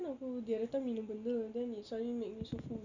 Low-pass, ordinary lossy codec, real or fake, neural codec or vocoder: 7.2 kHz; Opus, 64 kbps; real; none